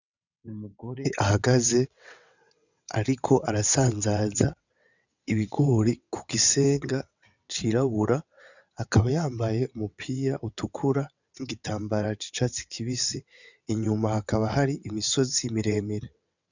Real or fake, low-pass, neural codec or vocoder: fake; 7.2 kHz; vocoder, 22.05 kHz, 80 mel bands, WaveNeXt